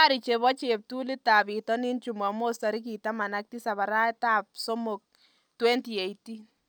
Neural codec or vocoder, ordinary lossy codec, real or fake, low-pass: none; none; real; none